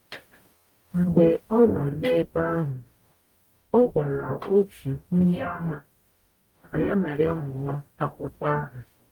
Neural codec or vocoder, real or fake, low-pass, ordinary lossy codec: codec, 44.1 kHz, 0.9 kbps, DAC; fake; 19.8 kHz; Opus, 32 kbps